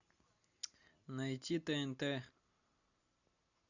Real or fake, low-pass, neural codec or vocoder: real; 7.2 kHz; none